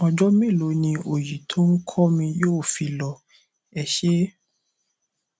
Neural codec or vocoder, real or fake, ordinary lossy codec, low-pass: none; real; none; none